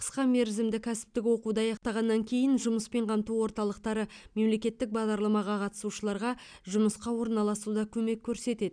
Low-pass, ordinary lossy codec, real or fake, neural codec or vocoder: 9.9 kHz; none; real; none